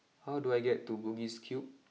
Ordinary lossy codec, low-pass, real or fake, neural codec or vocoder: none; none; real; none